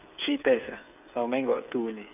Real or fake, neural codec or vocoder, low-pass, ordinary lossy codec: fake; codec, 16 kHz, 8 kbps, FreqCodec, smaller model; 3.6 kHz; none